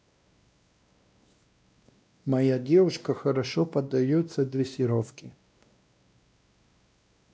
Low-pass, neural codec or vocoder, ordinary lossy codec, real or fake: none; codec, 16 kHz, 1 kbps, X-Codec, WavLM features, trained on Multilingual LibriSpeech; none; fake